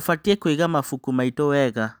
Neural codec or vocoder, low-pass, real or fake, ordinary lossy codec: none; none; real; none